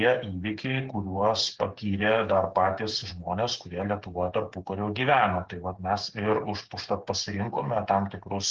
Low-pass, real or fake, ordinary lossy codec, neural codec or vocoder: 7.2 kHz; fake; Opus, 16 kbps; codec, 16 kHz, 8 kbps, FreqCodec, smaller model